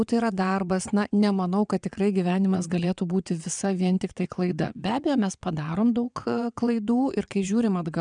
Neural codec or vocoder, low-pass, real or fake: vocoder, 22.05 kHz, 80 mel bands, WaveNeXt; 9.9 kHz; fake